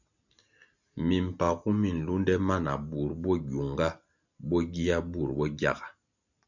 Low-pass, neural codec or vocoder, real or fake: 7.2 kHz; none; real